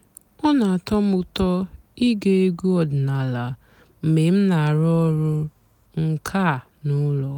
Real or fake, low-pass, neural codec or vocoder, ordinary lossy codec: real; 19.8 kHz; none; none